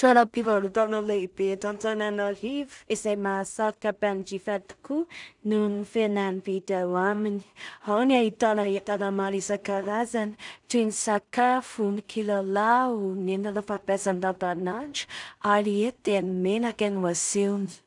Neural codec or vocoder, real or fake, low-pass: codec, 16 kHz in and 24 kHz out, 0.4 kbps, LongCat-Audio-Codec, two codebook decoder; fake; 10.8 kHz